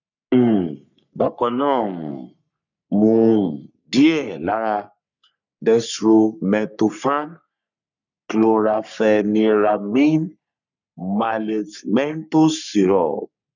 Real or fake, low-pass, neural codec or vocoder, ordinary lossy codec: fake; 7.2 kHz; codec, 44.1 kHz, 3.4 kbps, Pupu-Codec; none